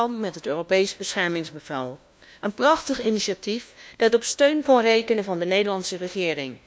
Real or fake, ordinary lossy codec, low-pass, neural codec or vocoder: fake; none; none; codec, 16 kHz, 1 kbps, FunCodec, trained on LibriTTS, 50 frames a second